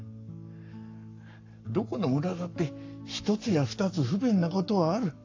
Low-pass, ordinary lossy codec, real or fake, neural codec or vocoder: 7.2 kHz; AAC, 48 kbps; fake; codec, 44.1 kHz, 7.8 kbps, Pupu-Codec